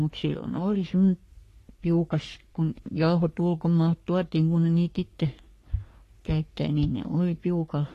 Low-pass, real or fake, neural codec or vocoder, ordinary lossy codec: 14.4 kHz; fake; codec, 44.1 kHz, 3.4 kbps, Pupu-Codec; AAC, 48 kbps